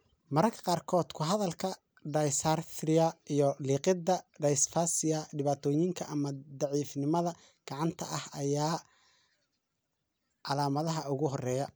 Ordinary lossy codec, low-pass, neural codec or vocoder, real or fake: none; none; none; real